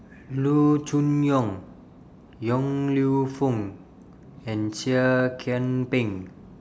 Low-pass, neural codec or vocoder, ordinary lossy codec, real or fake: none; none; none; real